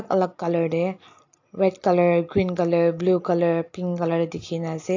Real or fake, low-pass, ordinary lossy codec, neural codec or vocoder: real; 7.2 kHz; AAC, 48 kbps; none